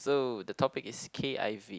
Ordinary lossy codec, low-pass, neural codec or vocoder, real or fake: none; none; none; real